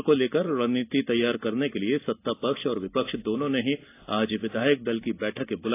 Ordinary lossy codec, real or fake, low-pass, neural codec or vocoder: AAC, 24 kbps; real; 3.6 kHz; none